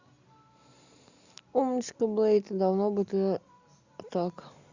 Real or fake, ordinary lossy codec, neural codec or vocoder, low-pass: real; Opus, 64 kbps; none; 7.2 kHz